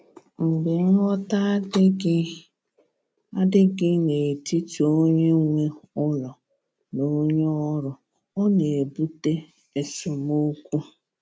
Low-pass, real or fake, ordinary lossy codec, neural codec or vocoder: none; real; none; none